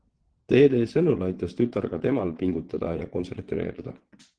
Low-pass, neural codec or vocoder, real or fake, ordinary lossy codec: 9.9 kHz; vocoder, 22.05 kHz, 80 mel bands, WaveNeXt; fake; Opus, 16 kbps